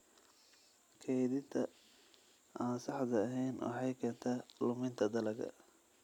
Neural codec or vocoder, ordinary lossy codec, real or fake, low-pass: none; none; real; 19.8 kHz